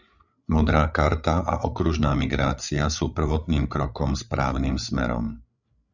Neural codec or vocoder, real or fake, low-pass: codec, 16 kHz, 8 kbps, FreqCodec, larger model; fake; 7.2 kHz